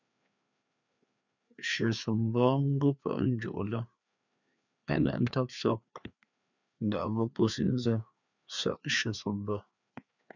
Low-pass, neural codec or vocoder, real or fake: 7.2 kHz; codec, 16 kHz, 2 kbps, FreqCodec, larger model; fake